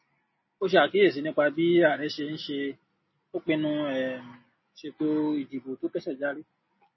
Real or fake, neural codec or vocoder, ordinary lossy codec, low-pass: real; none; MP3, 24 kbps; 7.2 kHz